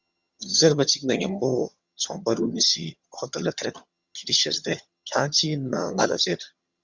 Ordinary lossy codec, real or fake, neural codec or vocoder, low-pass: Opus, 64 kbps; fake; vocoder, 22.05 kHz, 80 mel bands, HiFi-GAN; 7.2 kHz